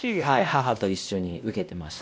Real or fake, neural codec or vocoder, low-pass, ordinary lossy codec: fake; codec, 16 kHz, 1 kbps, X-Codec, WavLM features, trained on Multilingual LibriSpeech; none; none